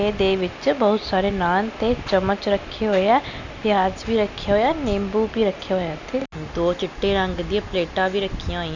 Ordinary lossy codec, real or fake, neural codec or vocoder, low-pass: none; real; none; 7.2 kHz